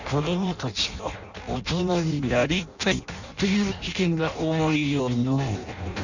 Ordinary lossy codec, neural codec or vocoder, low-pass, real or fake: none; codec, 16 kHz in and 24 kHz out, 0.6 kbps, FireRedTTS-2 codec; 7.2 kHz; fake